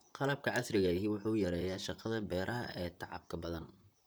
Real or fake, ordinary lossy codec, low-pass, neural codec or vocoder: fake; none; none; vocoder, 44.1 kHz, 128 mel bands, Pupu-Vocoder